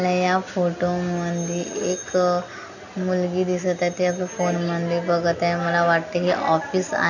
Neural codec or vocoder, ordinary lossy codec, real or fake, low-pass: none; none; real; 7.2 kHz